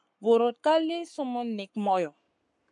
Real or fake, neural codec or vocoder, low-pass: fake; codec, 44.1 kHz, 7.8 kbps, Pupu-Codec; 10.8 kHz